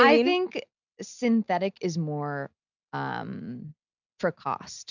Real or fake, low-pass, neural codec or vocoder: real; 7.2 kHz; none